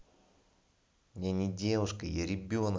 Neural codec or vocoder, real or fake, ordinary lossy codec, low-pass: none; real; none; none